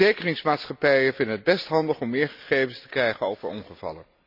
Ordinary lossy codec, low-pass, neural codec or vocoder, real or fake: none; 5.4 kHz; none; real